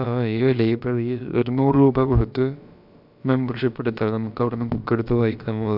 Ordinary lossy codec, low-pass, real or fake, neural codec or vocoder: none; 5.4 kHz; fake; codec, 16 kHz, about 1 kbps, DyCAST, with the encoder's durations